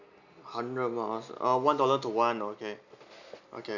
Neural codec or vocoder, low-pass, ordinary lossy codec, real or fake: none; 7.2 kHz; none; real